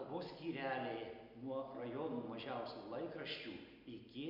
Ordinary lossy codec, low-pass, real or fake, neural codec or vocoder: Opus, 64 kbps; 5.4 kHz; real; none